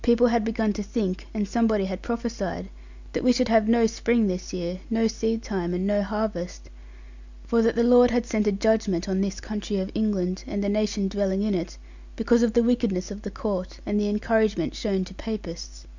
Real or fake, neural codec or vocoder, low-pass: real; none; 7.2 kHz